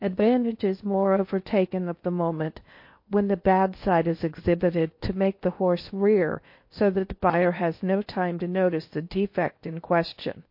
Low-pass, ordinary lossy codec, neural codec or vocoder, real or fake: 5.4 kHz; MP3, 32 kbps; codec, 16 kHz in and 24 kHz out, 0.8 kbps, FocalCodec, streaming, 65536 codes; fake